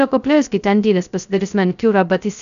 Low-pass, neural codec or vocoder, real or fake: 7.2 kHz; codec, 16 kHz, 0.2 kbps, FocalCodec; fake